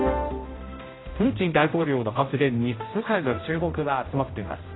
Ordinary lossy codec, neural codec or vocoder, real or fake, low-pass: AAC, 16 kbps; codec, 16 kHz, 0.5 kbps, X-Codec, HuBERT features, trained on general audio; fake; 7.2 kHz